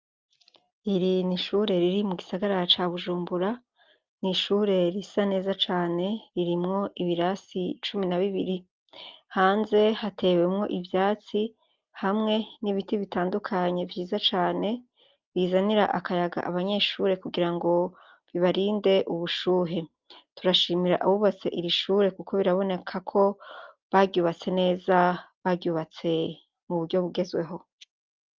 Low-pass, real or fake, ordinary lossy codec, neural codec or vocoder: 7.2 kHz; real; Opus, 24 kbps; none